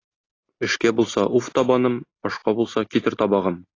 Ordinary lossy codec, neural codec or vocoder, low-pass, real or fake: AAC, 32 kbps; none; 7.2 kHz; real